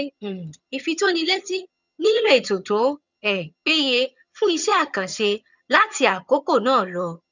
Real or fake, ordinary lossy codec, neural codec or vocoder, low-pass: fake; none; vocoder, 22.05 kHz, 80 mel bands, HiFi-GAN; 7.2 kHz